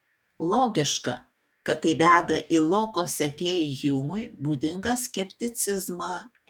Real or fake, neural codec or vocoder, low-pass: fake; codec, 44.1 kHz, 2.6 kbps, DAC; 19.8 kHz